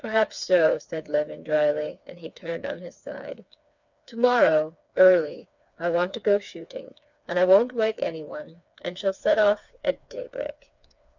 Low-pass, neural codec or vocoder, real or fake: 7.2 kHz; codec, 16 kHz, 4 kbps, FreqCodec, smaller model; fake